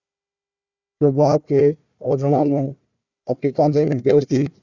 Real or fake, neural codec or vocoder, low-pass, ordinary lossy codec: fake; codec, 16 kHz, 1 kbps, FunCodec, trained on Chinese and English, 50 frames a second; 7.2 kHz; Opus, 64 kbps